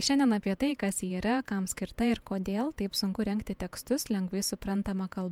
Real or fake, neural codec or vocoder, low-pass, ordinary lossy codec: fake; vocoder, 48 kHz, 128 mel bands, Vocos; 19.8 kHz; MP3, 96 kbps